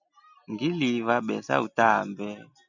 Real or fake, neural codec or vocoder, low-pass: real; none; 7.2 kHz